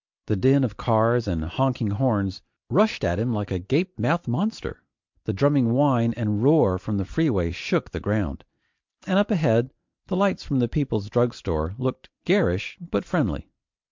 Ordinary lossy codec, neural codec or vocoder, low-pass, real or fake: MP3, 64 kbps; none; 7.2 kHz; real